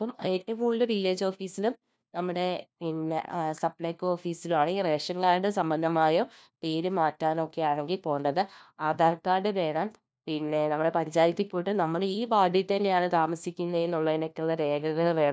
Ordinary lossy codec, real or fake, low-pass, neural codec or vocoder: none; fake; none; codec, 16 kHz, 1 kbps, FunCodec, trained on LibriTTS, 50 frames a second